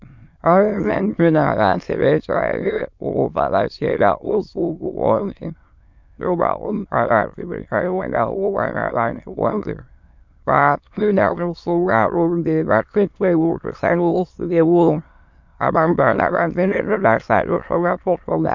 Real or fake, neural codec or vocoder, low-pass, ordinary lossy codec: fake; autoencoder, 22.05 kHz, a latent of 192 numbers a frame, VITS, trained on many speakers; 7.2 kHz; MP3, 64 kbps